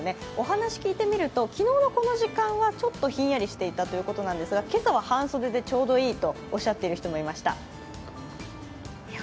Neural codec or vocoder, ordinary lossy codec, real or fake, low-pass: none; none; real; none